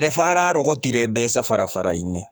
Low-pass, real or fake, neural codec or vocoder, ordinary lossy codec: none; fake; codec, 44.1 kHz, 2.6 kbps, SNAC; none